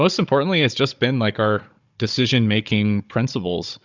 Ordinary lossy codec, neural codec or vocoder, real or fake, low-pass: Opus, 64 kbps; codec, 16 kHz, 16 kbps, FunCodec, trained on Chinese and English, 50 frames a second; fake; 7.2 kHz